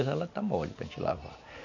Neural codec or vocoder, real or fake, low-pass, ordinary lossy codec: none; real; 7.2 kHz; none